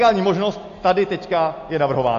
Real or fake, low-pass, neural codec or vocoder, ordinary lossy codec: real; 7.2 kHz; none; AAC, 48 kbps